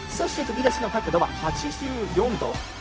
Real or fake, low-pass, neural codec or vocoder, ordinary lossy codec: fake; none; codec, 16 kHz, 0.4 kbps, LongCat-Audio-Codec; none